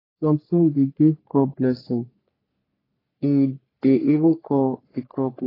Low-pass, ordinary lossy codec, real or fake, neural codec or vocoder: 5.4 kHz; AAC, 24 kbps; fake; codec, 44.1 kHz, 3.4 kbps, Pupu-Codec